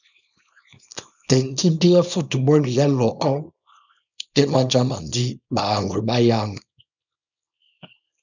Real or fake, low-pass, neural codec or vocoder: fake; 7.2 kHz; codec, 24 kHz, 0.9 kbps, WavTokenizer, small release